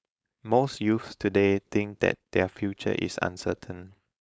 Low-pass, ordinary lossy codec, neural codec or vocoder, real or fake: none; none; codec, 16 kHz, 4.8 kbps, FACodec; fake